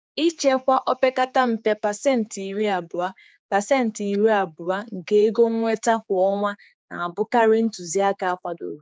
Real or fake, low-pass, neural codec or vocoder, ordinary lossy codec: fake; none; codec, 16 kHz, 4 kbps, X-Codec, HuBERT features, trained on general audio; none